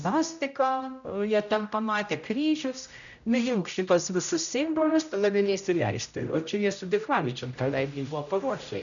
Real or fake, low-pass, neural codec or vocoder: fake; 7.2 kHz; codec, 16 kHz, 0.5 kbps, X-Codec, HuBERT features, trained on general audio